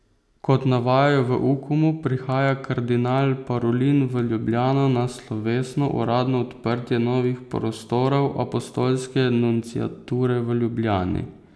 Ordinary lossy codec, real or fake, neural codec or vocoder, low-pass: none; real; none; none